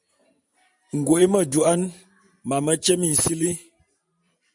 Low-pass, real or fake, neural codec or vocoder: 10.8 kHz; fake; vocoder, 44.1 kHz, 128 mel bands every 512 samples, BigVGAN v2